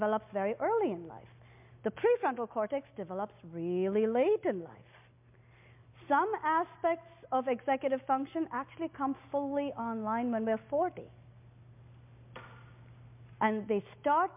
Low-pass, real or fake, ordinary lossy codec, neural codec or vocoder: 3.6 kHz; real; MP3, 32 kbps; none